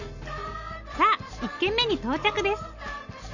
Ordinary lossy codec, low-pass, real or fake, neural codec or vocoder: none; 7.2 kHz; real; none